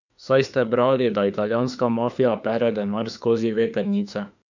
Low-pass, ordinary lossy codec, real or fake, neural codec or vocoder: 7.2 kHz; none; fake; autoencoder, 48 kHz, 32 numbers a frame, DAC-VAE, trained on Japanese speech